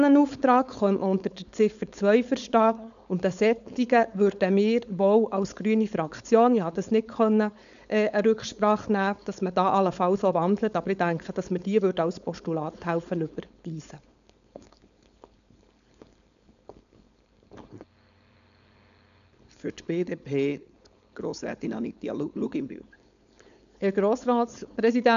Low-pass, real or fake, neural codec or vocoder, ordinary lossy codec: 7.2 kHz; fake; codec, 16 kHz, 4.8 kbps, FACodec; none